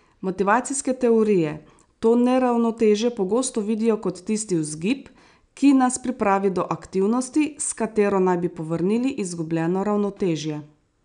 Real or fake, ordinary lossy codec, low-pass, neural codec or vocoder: real; none; 9.9 kHz; none